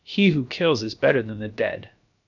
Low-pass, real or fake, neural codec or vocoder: 7.2 kHz; fake; codec, 16 kHz, about 1 kbps, DyCAST, with the encoder's durations